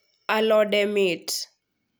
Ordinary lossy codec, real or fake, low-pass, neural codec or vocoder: none; real; none; none